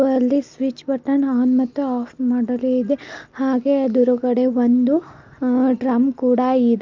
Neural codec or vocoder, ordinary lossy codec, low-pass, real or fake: none; Opus, 32 kbps; 7.2 kHz; real